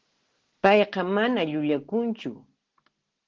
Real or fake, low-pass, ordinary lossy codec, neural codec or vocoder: real; 7.2 kHz; Opus, 16 kbps; none